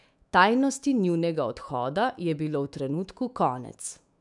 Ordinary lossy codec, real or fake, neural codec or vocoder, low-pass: none; fake; autoencoder, 48 kHz, 128 numbers a frame, DAC-VAE, trained on Japanese speech; 10.8 kHz